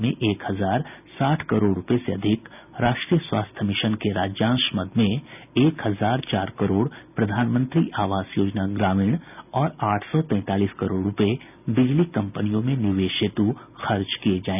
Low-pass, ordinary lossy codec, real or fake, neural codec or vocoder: 3.6 kHz; none; real; none